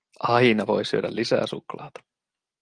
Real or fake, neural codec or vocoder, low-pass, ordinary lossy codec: real; none; 9.9 kHz; Opus, 24 kbps